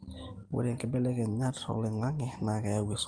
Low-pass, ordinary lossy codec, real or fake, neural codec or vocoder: 9.9 kHz; Opus, 24 kbps; real; none